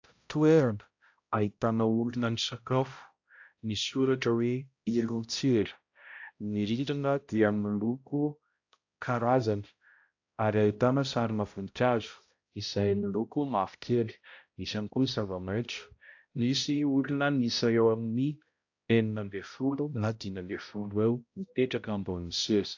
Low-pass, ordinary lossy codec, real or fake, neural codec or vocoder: 7.2 kHz; AAC, 48 kbps; fake; codec, 16 kHz, 0.5 kbps, X-Codec, HuBERT features, trained on balanced general audio